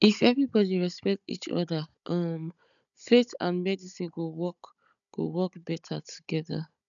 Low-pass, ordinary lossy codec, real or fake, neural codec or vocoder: 7.2 kHz; none; fake; codec, 16 kHz, 16 kbps, FunCodec, trained on Chinese and English, 50 frames a second